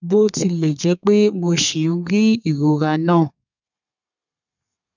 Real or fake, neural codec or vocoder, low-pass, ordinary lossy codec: fake; codec, 32 kHz, 1.9 kbps, SNAC; 7.2 kHz; none